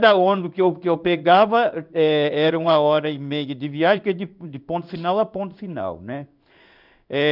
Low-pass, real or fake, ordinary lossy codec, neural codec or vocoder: 5.4 kHz; fake; none; codec, 16 kHz in and 24 kHz out, 1 kbps, XY-Tokenizer